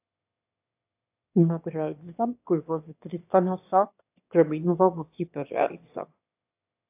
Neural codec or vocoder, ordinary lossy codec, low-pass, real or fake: autoencoder, 22.05 kHz, a latent of 192 numbers a frame, VITS, trained on one speaker; AAC, 32 kbps; 3.6 kHz; fake